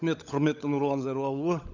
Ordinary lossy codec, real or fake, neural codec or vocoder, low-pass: none; fake; codec, 16 kHz, 16 kbps, FunCodec, trained on LibriTTS, 50 frames a second; 7.2 kHz